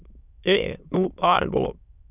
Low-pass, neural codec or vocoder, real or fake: 3.6 kHz; autoencoder, 22.05 kHz, a latent of 192 numbers a frame, VITS, trained on many speakers; fake